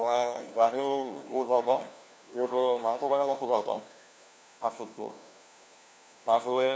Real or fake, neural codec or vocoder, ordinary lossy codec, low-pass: fake; codec, 16 kHz, 1 kbps, FunCodec, trained on LibriTTS, 50 frames a second; none; none